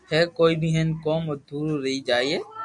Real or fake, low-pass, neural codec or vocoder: real; 10.8 kHz; none